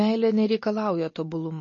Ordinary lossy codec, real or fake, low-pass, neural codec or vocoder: MP3, 32 kbps; real; 7.2 kHz; none